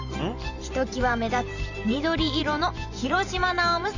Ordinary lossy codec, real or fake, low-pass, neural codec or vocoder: none; fake; 7.2 kHz; vocoder, 44.1 kHz, 128 mel bands every 512 samples, BigVGAN v2